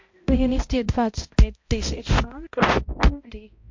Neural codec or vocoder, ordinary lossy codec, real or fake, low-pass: codec, 16 kHz, 0.5 kbps, X-Codec, HuBERT features, trained on balanced general audio; MP3, 48 kbps; fake; 7.2 kHz